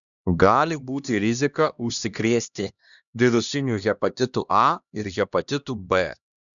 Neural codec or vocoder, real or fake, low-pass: codec, 16 kHz, 1 kbps, X-Codec, HuBERT features, trained on LibriSpeech; fake; 7.2 kHz